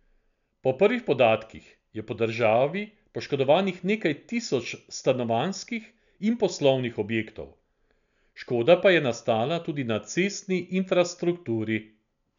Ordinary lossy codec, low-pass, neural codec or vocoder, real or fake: none; 7.2 kHz; none; real